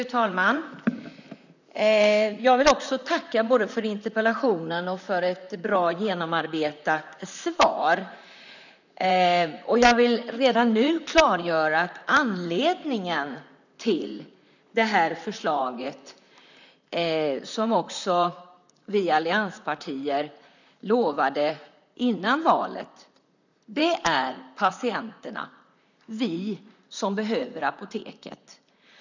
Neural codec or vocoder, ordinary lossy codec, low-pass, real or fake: vocoder, 44.1 kHz, 128 mel bands, Pupu-Vocoder; none; 7.2 kHz; fake